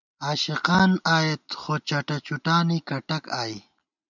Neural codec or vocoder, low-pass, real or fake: none; 7.2 kHz; real